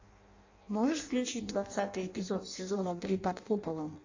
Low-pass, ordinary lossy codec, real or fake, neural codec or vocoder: 7.2 kHz; AAC, 48 kbps; fake; codec, 16 kHz in and 24 kHz out, 0.6 kbps, FireRedTTS-2 codec